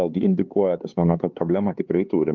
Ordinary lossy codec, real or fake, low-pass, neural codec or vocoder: Opus, 32 kbps; fake; 7.2 kHz; codec, 16 kHz, 4 kbps, X-Codec, HuBERT features, trained on LibriSpeech